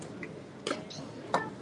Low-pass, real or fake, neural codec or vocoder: 10.8 kHz; real; none